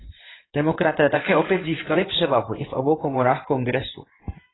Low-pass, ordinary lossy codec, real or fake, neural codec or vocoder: 7.2 kHz; AAC, 16 kbps; fake; codec, 16 kHz in and 24 kHz out, 2.2 kbps, FireRedTTS-2 codec